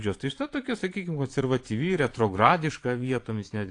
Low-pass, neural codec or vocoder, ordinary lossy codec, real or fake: 9.9 kHz; vocoder, 22.05 kHz, 80 mel bands, Vocos; AAC, 48 kbps; fake